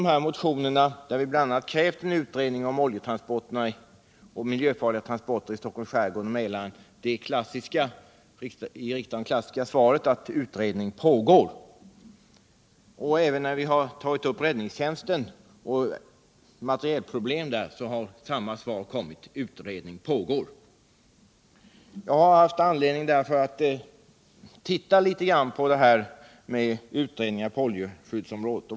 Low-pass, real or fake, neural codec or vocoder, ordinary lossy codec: none; real; none; none